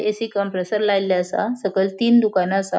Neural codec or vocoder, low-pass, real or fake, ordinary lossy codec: none; none; real; none